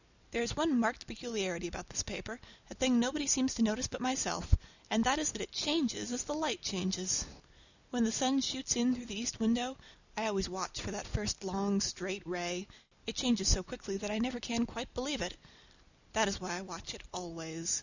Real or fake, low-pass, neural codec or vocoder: real; 7.2 kHz; none